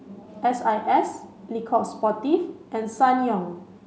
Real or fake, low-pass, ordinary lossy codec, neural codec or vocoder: real; none; none; none